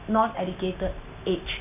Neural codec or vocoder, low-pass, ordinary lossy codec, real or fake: none; 3.6 kHz; none; real